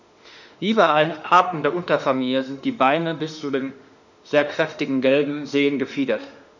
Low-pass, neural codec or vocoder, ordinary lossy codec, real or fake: 7.2 kHz; autoencoder, 48 kHz, 32 numbers a frame, DAC-VAE, trained on Japanese speech; none; fake